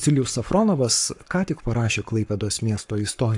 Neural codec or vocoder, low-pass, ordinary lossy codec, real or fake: codec, 44.1 kHz, 7.8 kbps, Pupu-Codec; 10.8 kHz; AAC, 64 kbps; fake